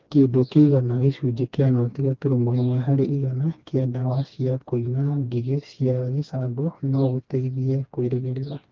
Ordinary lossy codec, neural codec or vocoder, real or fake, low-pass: Opus, 24 kbps; codec, 16 kHz, 2 kbps, FreqCodec, smaller model; fake; 7.2 kHz